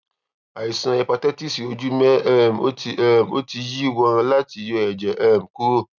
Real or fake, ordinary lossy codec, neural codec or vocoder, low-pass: real; none; none; 7.2 kHz